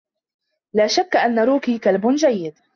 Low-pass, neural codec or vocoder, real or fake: 7.2 kHz; none; real